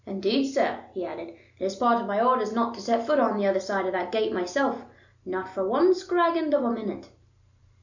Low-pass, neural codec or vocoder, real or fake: 7.2 kHz; none; real